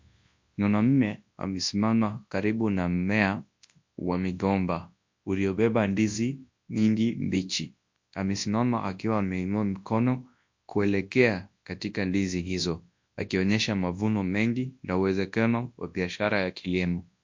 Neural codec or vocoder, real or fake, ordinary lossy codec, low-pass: codec, 24 kHz, 0.9 kbps, WavTokenizer, large speech release; fake; MP3, 48 kbps; 7.2 kHz